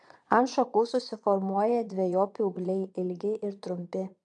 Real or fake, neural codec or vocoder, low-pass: fake; vocoder, 22.05 kHz, 80 mel bands, Vocos; 9.9 kHz